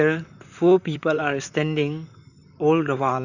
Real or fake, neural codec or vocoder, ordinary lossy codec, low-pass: fake; vocoder, 44.1 kHz, 128 mel bands, Pupu-Vocoder; none; 7.2 kHz